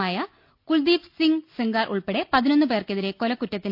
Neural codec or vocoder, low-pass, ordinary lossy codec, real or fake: none; 5.4 kHz; none; real